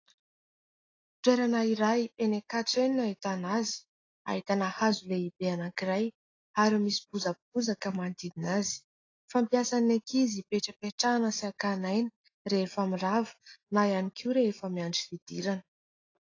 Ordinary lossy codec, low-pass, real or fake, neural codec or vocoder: AAC, 32 kbps; 7.2 kHz; real; none